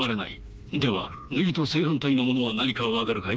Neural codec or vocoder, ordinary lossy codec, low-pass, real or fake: codec, 16 kHz, 2 kbps, FreqCodec, smaller model; none; none; fake